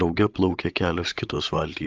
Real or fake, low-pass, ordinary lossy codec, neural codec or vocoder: fake; 7.2 kHz; Opus, 24 kbps; codec, 16 kHz, 16 kbps, FreqCodec, larger model